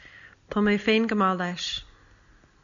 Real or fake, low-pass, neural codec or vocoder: real; 7.2 kHz; none